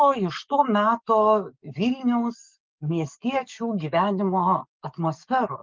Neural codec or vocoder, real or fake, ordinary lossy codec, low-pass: codec, 44.1 kHz, 7.8 kbps, DAC; fake; Opus, 32 kbps; 7.2 kHz